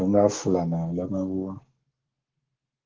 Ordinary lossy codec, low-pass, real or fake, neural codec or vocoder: Opus, 16 kbps; 7.2 kHz; fake; codec, 44.1 kHz, 7.8 kbps, Pupu-Codec